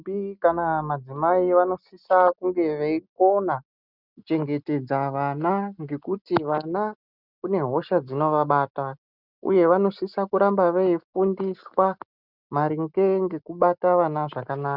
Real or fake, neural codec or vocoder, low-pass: real; none; 5.4 kHz